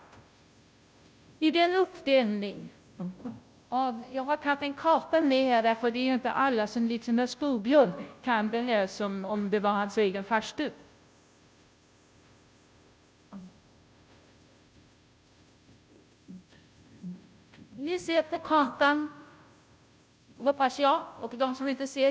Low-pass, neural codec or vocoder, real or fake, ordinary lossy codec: none; codec, 16 kHz, 0.5 kbps, FunCodec, trained on Chinese and English, 25 frames a second; fake; none